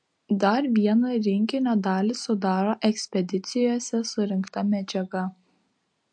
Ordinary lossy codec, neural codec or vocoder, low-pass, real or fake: MP3, 48 kbps; none; 9.9 kHz; real